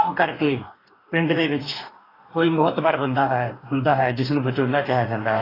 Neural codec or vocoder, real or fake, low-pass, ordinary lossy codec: codec, 44.1 kHz, 2.6 kbps, DAC; fake; 5.4 kHz; AAC, 24 kbps